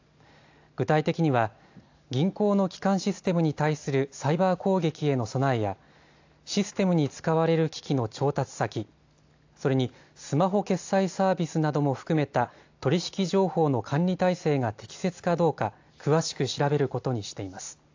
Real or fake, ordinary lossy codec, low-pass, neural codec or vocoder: real; AAC, 48 kbps; 7.2 kHz; none